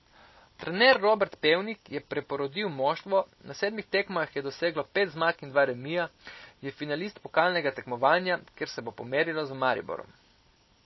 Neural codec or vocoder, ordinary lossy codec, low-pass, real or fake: none; MP3, 24 kbps; 7.2 kHz; real